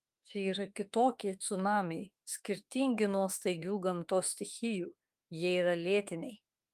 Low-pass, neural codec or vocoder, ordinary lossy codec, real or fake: 14.4 kHz; autoencoder, 48 kHz, 32 numbers a frame, DAC-VAE, trained on Japanese speech; Opus, 32 kbps; fake